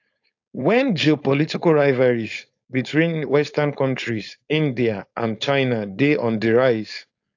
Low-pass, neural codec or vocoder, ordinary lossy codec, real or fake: 7.2 kHz; codec, 16 kHz, 4.8 kbps, FACodec; AAC, 48 kbps; fake